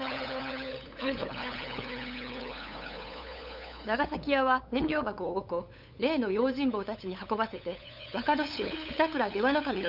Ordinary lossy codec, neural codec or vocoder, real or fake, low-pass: none; codec, 16 kHz, 8 kbps, FunCodec, trained on LibriTTS, 25 frames a second; fake; 5.4 kHz